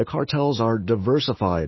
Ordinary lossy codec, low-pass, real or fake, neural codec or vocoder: MP3, 24 kbps; 7.2 kHz; fake; autoencoder, 48 kHz, 128 numbers a frame, DAC-VAE, trained on Japanese speech